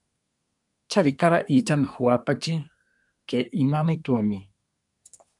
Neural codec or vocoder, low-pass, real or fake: codec, 24 kHz, 1 kbps, SNAC; 10.8 kHz; fake